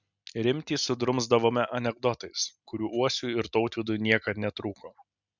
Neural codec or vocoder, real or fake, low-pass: none; real; 7.2 kHz